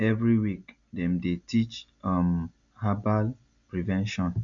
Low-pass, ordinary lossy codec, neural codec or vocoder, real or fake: 7.2 kHz; MP3, 64 kbps; none; real